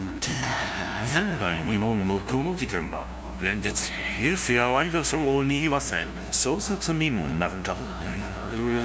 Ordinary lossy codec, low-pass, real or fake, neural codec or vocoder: none; none; fake; codec, 16 kHz, 0.5 kbps, FunCodec, trained on LibriTTS, 25 frames a second